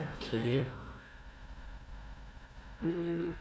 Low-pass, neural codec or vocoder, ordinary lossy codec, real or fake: none; codec, 16 kHz, 1 kbps, FunCodec, trained on Chinese and English, 50 frames a second; none; fake